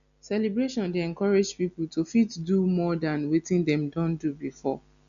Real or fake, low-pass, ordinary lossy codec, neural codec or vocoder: real; 7.2 kHz; none; none